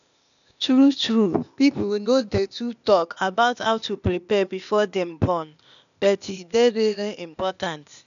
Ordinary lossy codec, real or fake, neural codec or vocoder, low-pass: none; fake; codec, 16 kHz, 0.8 kbps, ZipCodec; 7.2 kHz